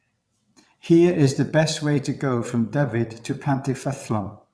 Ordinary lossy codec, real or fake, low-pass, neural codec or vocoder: none; fake; none; vocoder, 22.05 kHz, 80 mel bands, WaveNeXt